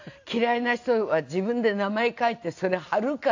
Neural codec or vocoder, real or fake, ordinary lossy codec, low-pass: none; real; AAC, 48 kbps; 7.2 kHz